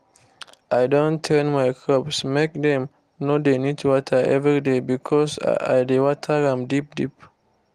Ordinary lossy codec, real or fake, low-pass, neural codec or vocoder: Opus, 24 kbps; real; 14.4 kHz; none